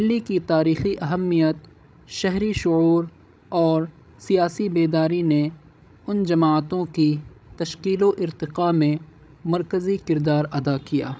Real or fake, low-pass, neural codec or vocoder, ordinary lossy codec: fake; none; codec, 16 kHz, 16 kbps, FreqCodec, larger model; none